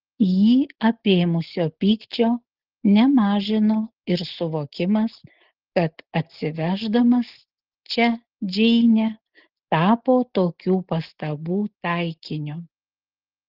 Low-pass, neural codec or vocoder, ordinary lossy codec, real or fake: 5.4 kHz; none; Opus, 16 kbps; real